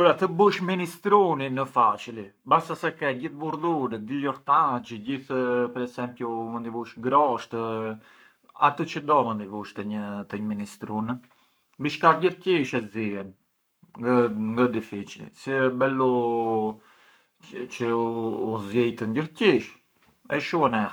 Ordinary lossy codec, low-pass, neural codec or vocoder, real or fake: none; none; codec, 44.1 kHz, 7.8 kbps, Pupu-Codec; fake